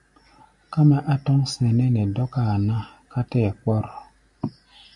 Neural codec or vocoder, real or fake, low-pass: none; real; 10.8 kHz